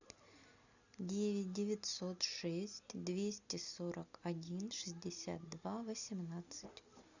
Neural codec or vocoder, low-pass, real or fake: none; 7.2 kHz; real